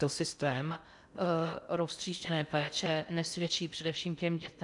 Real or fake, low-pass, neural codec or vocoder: fake; 10.8 kHz; codec, 16 kHz in and 24 kHz out, 0.6 kbps, FocalCodec, streaming, 4096 codes